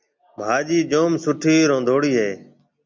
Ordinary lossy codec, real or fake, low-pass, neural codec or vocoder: MP3, 48 kbps; real; 7.2 kHz; none